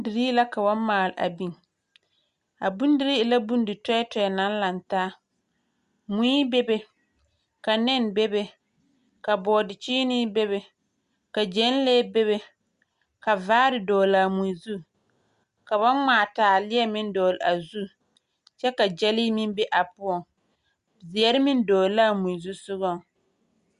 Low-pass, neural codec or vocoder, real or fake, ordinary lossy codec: 10.8 kHz; none; real; Opus, 64 kbps